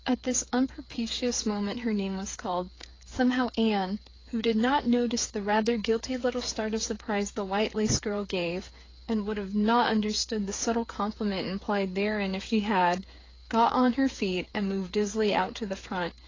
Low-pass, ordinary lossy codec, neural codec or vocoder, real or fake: 7.2 kHz; AAC, 32 kbps; codec, 16 kHz, 8 kbps, FreqCodec, smaller model; fake